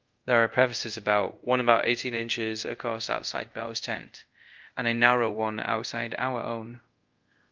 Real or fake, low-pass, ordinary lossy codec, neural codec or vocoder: fake; 7.2 kHz; Opus, 24 kbps; codec, 24 kHz, 0.5 kbps, DualCodec